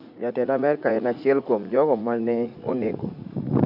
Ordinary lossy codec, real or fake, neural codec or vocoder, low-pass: none; fake; vocoder, 44.1 kHz, 80 mel bands, Vocos; 5.4 kHz